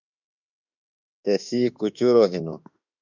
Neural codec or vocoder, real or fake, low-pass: autoencoder, 48 kHz, 32 numbers a frame, DAC-VAE, trained on Japanese speech; fake; 7.2 kHz